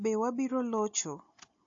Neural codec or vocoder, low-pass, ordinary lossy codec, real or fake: none; 7.2 kHz; AAC, 48 kbps; real